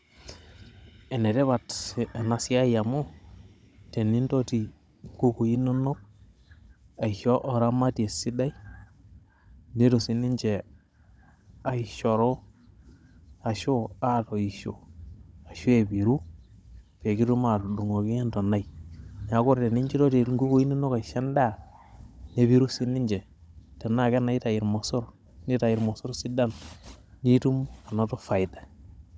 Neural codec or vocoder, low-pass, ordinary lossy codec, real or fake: codec, 16 kHz, 16 kbps, FunCodec, trained on Chinese and English, 50 frames a second; none; none; fake